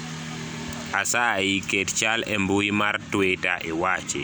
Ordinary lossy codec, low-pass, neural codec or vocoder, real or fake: none; none; none; real